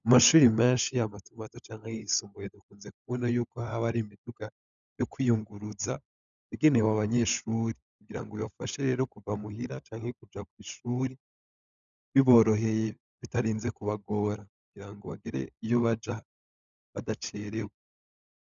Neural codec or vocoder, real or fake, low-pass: codec, 16 kHz, 16 kbps, FunCodec, trained on LibriTTS, 50 frames a second; fake; 7.2 kHz